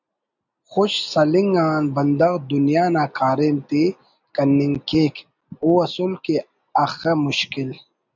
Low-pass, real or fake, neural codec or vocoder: 7.2 kHz; real; none